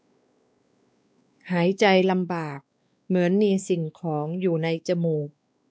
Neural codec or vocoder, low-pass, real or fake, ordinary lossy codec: codec, 16 kHz, 2 kbps, X-Codec, WavLM features, trained on Multilingual LibriSpeech; none; fake; none